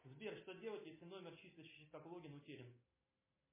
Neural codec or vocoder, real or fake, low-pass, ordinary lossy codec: none; real; 3.6 kHz; MP3, 16 kbps